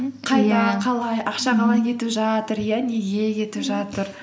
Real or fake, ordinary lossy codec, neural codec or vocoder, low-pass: real; none; none; none